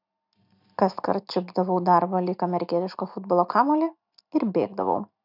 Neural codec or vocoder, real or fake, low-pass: none; real; 5.4 kHz